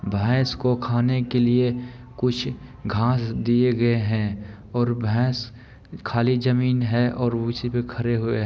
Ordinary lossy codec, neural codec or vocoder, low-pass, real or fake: none; none; none; real